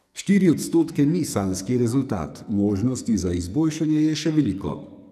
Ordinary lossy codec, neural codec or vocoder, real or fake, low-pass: none; codec, 44.1 kHz, 2.6 kbps, SNAC; fake; 14.4 kHz